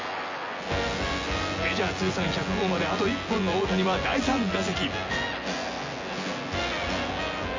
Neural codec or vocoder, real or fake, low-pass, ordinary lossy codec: vocoder, 24 kHz, 100 mel bands, Vocos; fake; 7.2 kHz; AAC, 32 kbps